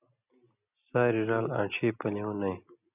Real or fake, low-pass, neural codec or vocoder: real; 3.6 kHz; none